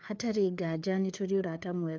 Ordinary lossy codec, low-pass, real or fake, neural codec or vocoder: none; none; fake; codec, 16 kHz, 4 kbps, FreqCodec, larger model